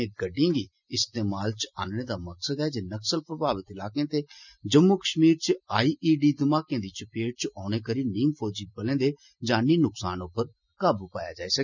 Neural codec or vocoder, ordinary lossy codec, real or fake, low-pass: none; none; real; 7.2 kHz